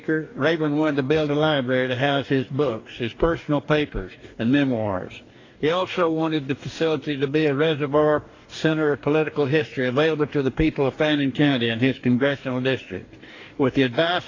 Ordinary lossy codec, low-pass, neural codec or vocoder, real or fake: AAC, 32 kbps; 7.2 kHz; codec, 44.1 kHz, 2.6 kbps, DAC; fake